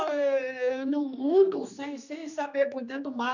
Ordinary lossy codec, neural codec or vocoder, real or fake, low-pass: none; codec, 16 kHz, 2 kbps, X-Codec, HuBERT features, trained on general audio; fake; 7.2 kHz